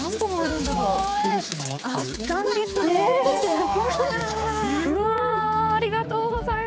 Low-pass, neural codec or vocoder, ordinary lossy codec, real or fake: none; codec, 16 kHz, 4 kbps, X-Codec, HuBERT features, trained on general audio; none; fake